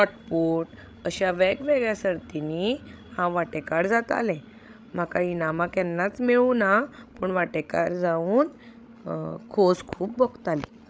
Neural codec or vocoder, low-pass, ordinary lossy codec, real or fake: codec, 16 kHz, 16 kbps, FreqCodec, larger model; none; none; fake